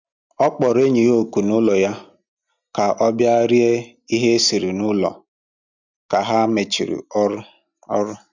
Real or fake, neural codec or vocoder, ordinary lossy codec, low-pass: real; none; none; 7.2 kHz